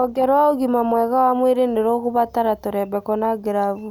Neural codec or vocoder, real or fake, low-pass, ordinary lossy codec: none; real; 19.8 kHz; none